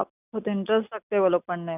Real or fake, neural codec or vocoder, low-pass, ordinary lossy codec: real; none; 3.6 kHz; none